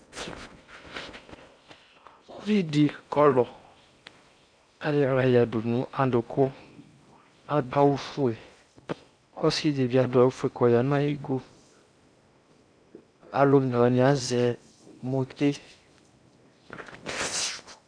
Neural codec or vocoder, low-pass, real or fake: codec, 16 kHz in and 24 kHz out, 0.6 kbps, FocalCodec, streaming, 4096 codes; 9.9 kHz; fake